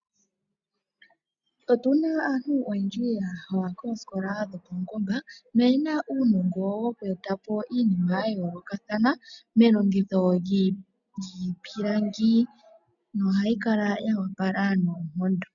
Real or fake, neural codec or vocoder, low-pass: real; none; 7.2 kHz